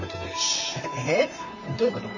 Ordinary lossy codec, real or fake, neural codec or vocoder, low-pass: none; fake; vocoder, 44.1 kHz, 128 mel bands, Pupu-Vocoder; 7.2 kHz